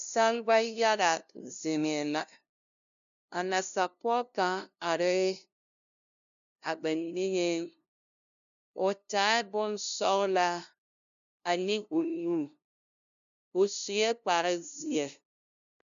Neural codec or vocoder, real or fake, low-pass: codec, 16 kHz, 0.5 kbps, FunCodec, trained on LibriTTS, 25 frames a second; fake; 7.2 kHz